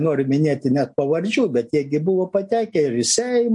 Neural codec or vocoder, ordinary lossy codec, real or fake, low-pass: none; MP3, 48 kbps; real; 10.8 kHz